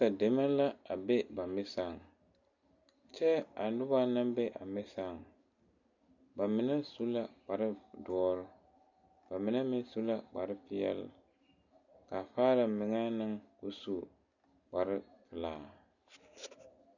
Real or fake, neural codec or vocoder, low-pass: real; none; 7.2 kHz